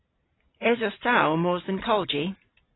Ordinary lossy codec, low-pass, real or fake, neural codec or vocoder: AAC, 16 kbps; 7.2 kHz; real; none